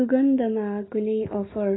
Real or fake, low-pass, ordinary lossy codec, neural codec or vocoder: real; 7.2 kHz; AAC, 16 kbps; none